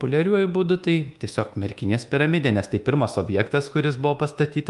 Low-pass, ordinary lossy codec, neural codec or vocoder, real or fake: 10.8 kHz; Opus, 32 kbps; codec, 24 kHz, 1.2 kbps, DualCodec; fake